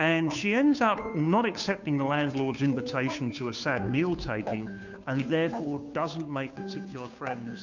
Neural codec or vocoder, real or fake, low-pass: codec, 16 kHz, 2 kbps, FunCodec, trained on Chinese and English, 25 frames a second; fake; 7.2 kHz